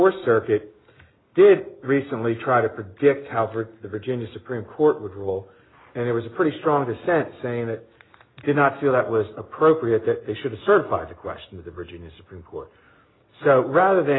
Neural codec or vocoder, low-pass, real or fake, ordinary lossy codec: none; 7.2 kHz; real; AAC, 16 kbps